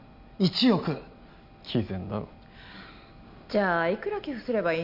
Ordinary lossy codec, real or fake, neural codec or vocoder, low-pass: none; real; none; 5.4 kHz